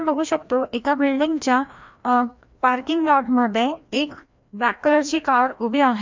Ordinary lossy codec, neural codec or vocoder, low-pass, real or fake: MP3, 64 kbps; codec, 16 kHz, 1 kbps, FreqCodec, larger model; 7.2 kHz; fake